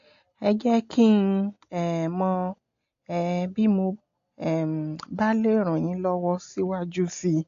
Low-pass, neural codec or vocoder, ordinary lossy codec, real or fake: 7.2 kHz; none; AAC, 48 kbps; real